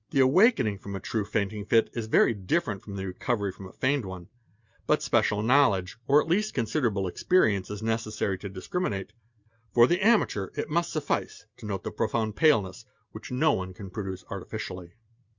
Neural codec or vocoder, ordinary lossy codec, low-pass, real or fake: none; Opus, 64 kbps; 7.2 kHz; real